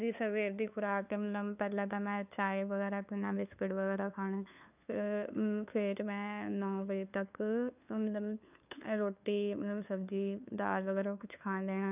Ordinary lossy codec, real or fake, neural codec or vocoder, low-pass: none; fake; codec, 16 kHz, 2 kbps, FunCodec, trained on LibriTTS, 25 frames a second; 3.6 kHz